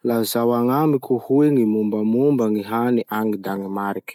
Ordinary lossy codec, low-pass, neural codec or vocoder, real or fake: none; 19.8 kHz; none; real